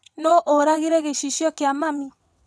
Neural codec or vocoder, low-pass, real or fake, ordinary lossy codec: vocoder, 22.05 kHz, 80 mel bands, WaveNeXt; none; fake; none